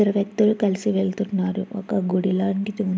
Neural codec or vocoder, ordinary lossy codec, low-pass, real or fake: none; none; none; real